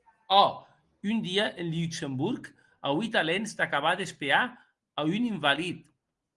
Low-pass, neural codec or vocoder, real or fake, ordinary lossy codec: 10.8 kHz; none; real; Opus, 24 kbps